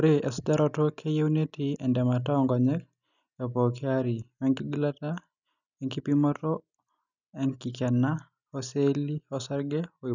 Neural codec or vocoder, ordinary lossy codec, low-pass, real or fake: none; none; 7.2 kHz; real